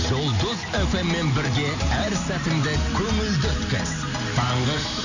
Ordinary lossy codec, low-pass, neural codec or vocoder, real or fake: none; 7.2 kHz; none; real